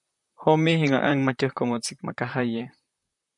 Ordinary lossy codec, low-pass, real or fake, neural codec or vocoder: MP3, 96 kbps; 10.8 kHz; fake; vocoder, 44.1 kHz, 128 mel bands, Pupu-Vocoder